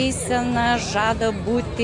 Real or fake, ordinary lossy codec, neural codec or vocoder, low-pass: real; AAC, 64 kbps; none; 10.8 kHz